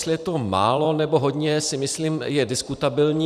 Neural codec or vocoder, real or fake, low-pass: vocoder, 44.1 kHz, 128 mel bands every 512 samples, BigVGAN v2; fake; 14.4 kHz